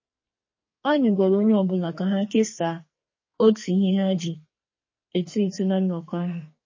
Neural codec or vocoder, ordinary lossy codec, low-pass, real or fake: codec, 44.1 kHz, 2.6 kbps, SNAC; MP3, 32 kbps; 7.2 kHz; fake